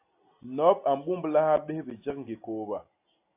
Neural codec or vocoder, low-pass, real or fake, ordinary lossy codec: none; 3.6 kHz; real; AAC, 24 kbps